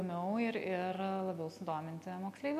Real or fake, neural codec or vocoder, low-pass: real; none; 14.4 kHz